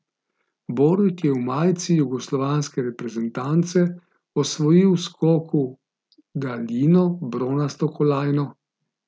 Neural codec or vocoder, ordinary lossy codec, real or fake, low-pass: none; none; real; none